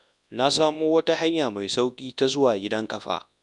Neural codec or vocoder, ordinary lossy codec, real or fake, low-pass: codec, 24 kHz, 0.9 kbps, WavTokenizer, large speech release; none; fake; 10.8 kHz